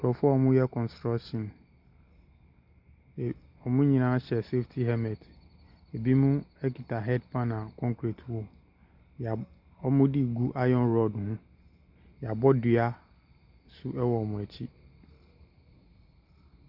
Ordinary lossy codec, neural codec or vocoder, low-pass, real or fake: Opus, 64 kbps; none; 5.4 kHz; real